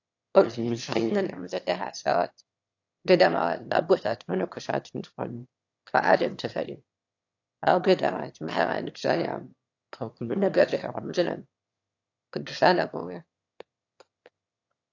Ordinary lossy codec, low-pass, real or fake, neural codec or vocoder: AAC, 48 kbps; 7.2 kHz; fake; autoencoder, 22.05 kHz, a latent of 192 numbers a frame, VITS, trained on one speaker